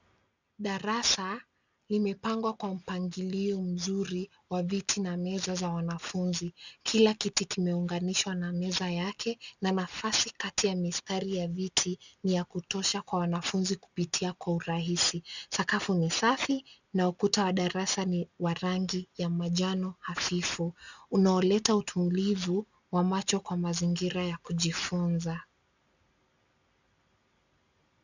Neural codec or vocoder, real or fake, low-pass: none; real; 7.2 kHz